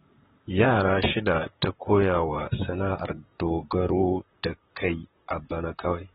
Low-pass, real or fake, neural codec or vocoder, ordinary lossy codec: 19.8 kHz; fake; vocoder, 44.1 kHz, 128 mel bands, Pupu-Vocoder; AAC, 16 kbps